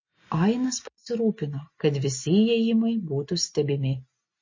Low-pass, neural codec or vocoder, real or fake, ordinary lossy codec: 7.2 kHz; none; real; MP3, 32 kbps